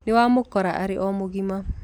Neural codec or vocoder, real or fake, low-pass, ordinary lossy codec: none; real; 19.8 kHz; none